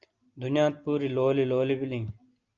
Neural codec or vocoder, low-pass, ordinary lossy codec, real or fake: none; 7.2 kHz; Opus, 24 kbps; real